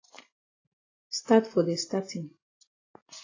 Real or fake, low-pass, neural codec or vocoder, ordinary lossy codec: real; 7.2 kHz; none; AAC, 32 kbps